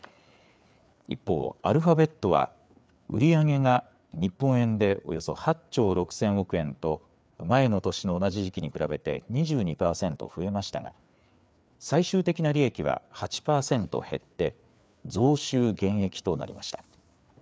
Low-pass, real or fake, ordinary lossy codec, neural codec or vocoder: none; fake; none; codec, 16 kHz, 4 kbps, FreqCodec, larger model